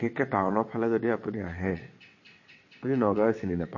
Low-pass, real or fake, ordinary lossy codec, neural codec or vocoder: 7.2 kHz; fake; MP3, 32 kbps; vocoder, 22.05 kHz, 80 mel bands, WaveNeXt